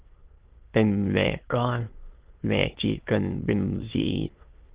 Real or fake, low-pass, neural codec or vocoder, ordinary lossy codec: fake; 3.6 kHz; autoencoder, 22.05 kHz, a latent of 192 numbers a frame, VITS, trained on many speakers; Opus, 16 kbps